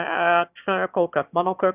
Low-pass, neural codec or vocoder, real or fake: 3.6 kHz; autoencoder, 22.05 kHz, a latent of 192 numbers a frame, VITS, trained on one speaker; fake